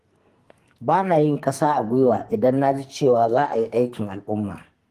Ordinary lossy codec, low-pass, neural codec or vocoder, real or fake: Opus, 24 kbps; 14.4 kHz; codec, 44.1 kHz, 2.6 kbps, SNAC; fake